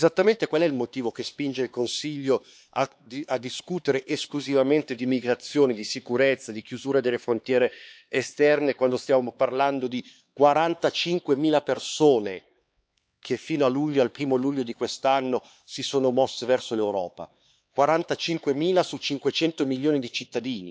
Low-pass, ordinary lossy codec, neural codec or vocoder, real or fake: none; none; codec, 16 kHz, 4 kbps, X-Codec, HuBERT features, trained on LibriSpeech; fake